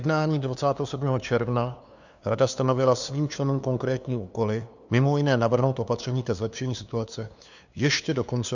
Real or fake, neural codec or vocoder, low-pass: fake; codec, 16 kHz, 2 kbps, FunCodec, trained on LibriTTS, 25 frames a second; 7.2 kHz